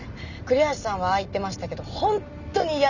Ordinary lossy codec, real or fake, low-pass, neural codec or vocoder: none; real; 7.2 kHz; none